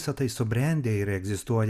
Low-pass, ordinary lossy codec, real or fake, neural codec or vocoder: 14.4 kHz; Opus, 64 kbps; real; none